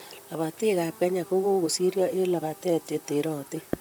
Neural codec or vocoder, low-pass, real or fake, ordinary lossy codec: vocoder, 44.1 kHz, 128 mel bands, Pupu-Vocoder; none; fake; none